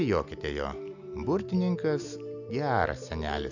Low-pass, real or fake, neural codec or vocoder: 7.2 kHz; real; none